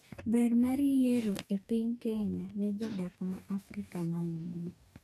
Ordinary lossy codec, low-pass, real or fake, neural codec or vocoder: none; 14.4 kHz; fake; codec, 44.1 kHz, 2.6 kbps, DAC